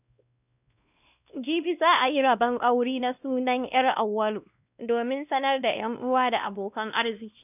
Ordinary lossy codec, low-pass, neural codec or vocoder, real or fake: none; 3.6 kHz; codec, 16 kHz, 1 kbps, X-Codec, WavLM features, trained on Multilingual LibriSpeech; fake